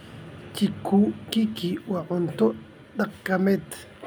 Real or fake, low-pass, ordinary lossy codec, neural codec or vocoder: real; none; none; none